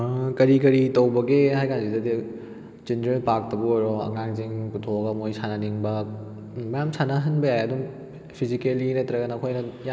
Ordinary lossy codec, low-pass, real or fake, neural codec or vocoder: none; none; real; none